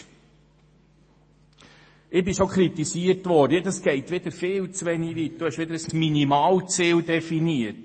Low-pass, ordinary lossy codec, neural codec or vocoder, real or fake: 9.9 kHz; MP3, 32 kbps; autoencoder, 48 kHz, 128 numbers a frame, DAC-VAE, trained on Japanese speech; fake